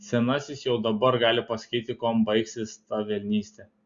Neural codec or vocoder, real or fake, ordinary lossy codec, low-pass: none; real; Opus, 64 kbps; 7.2 kHz